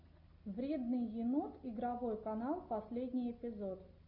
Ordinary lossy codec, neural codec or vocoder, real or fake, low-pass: MP3, 48 kbps; none; real; 5.4 kHz